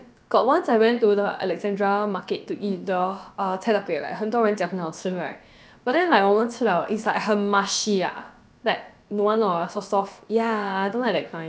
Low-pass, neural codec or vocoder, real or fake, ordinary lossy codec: none; codec, 16 kHz, about 1 kbps, DyCAST, with the encoder's durations; fake; none